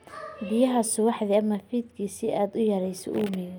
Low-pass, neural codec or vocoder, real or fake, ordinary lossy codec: none; none; real; none